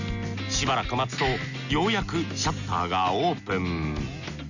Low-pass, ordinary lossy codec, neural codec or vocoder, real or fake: 7.2 kHz; none; none; real